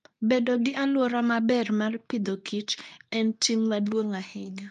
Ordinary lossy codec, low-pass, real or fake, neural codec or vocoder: none; 10.8 kHz; fake; codec, 24 kHz, 0.9 kbps, WavTokenizer, medium speech release version 2